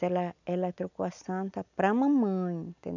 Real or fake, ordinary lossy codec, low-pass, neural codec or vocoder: real; none; 7.2 kHz; none